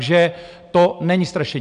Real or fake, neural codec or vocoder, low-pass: real; none; 9.9 kHz